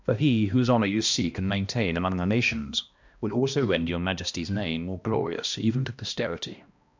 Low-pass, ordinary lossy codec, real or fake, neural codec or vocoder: 7.2 kHz; MP3, 64 kbps; fake; codec, 16 kHz, 1 kbps, X-Codec, HuBERT features, trained on balanced general audio